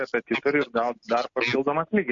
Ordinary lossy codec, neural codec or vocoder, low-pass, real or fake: MP3, 48 kbps; none; 7.2 kHz; real